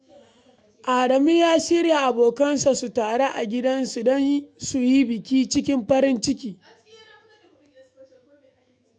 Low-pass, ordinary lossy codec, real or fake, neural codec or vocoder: 9.9 kHz; none; fake; autoencoder, 48 kHz, 128 numbers a frame, DAC-VAE, trained on Japanese speech